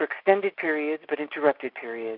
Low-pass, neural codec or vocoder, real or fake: 5.4 kHz; none; real